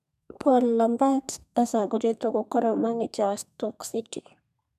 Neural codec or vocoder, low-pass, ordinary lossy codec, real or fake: codec, 32 kHz, 1.9 kbps, SNAC; 14.4 kHz; none; fake